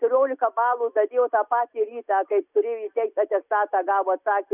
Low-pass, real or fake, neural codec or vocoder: 3.6 kHz; real; none